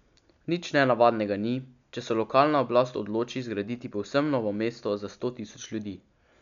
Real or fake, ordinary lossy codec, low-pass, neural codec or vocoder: real; none; 7.2 kHz; none